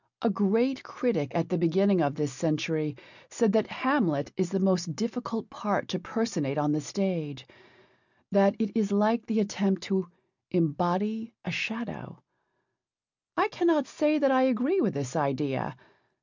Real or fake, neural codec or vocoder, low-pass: real; none; 7.2 kHz